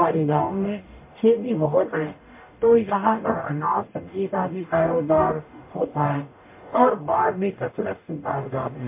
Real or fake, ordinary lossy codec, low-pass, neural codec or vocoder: fake; MP3, 24 kbps; 3.6 kHz; codec, 44.1 kHz, 0.9 kbps, DAC